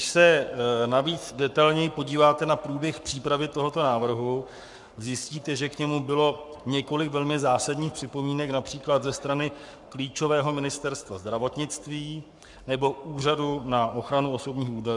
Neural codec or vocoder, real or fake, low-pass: codec, 44.1 kHz, 7.8 kbps, Pupu-Codec; fake; 10.8 kHz